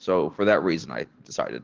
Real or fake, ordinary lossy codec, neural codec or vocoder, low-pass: real; Opus, 16 kbps; none; 7.2 kHz